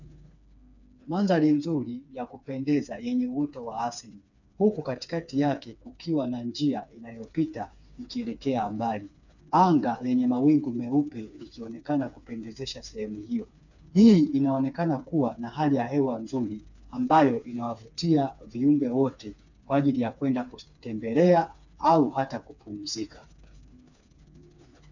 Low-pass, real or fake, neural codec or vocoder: 7.2 kHz; fake; codec, 16 kHz, 4 kbps, FreqCodec, smaller model